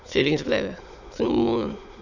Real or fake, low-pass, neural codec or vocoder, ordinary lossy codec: fake; 7.2 kHz; autoencoder, 22.05 kHz, a latent of 192 numbers a frame, VITS, trained on many speakers; none